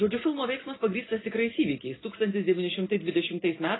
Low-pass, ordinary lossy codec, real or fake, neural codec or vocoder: 7.2 kHz; AAC, 16 kbps; real; none